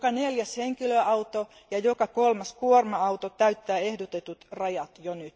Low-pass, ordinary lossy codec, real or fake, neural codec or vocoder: none; none; real; none